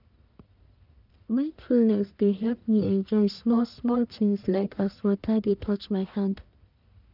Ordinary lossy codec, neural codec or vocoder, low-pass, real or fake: none; codec, 44.1 kHz, 1.7 kbps, Pupu-Codec; 5.4 kHz; fake